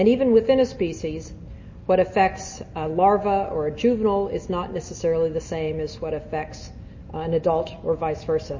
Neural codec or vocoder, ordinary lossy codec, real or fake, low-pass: none; MP3, 32 kbps; real; 7.2 kHz